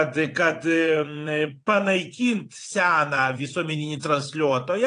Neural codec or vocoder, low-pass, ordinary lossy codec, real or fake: vocoder, 22.05 kHz, 80 mel bands, WaveNeXt; 9.9 kHz; AAC, 48 kbps; fake